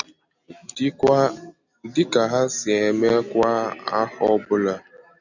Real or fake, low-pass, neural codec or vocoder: real; 7.2 kHz; none